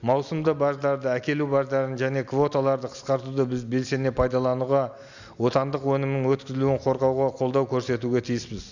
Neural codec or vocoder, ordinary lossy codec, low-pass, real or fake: none; none; 7.2 kHz; real